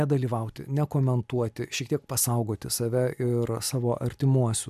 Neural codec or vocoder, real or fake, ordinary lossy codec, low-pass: none; real; MP3, 96 kbps; 14.4 kHz